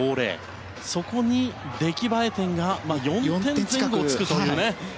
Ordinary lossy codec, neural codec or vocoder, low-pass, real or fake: none; none; none; real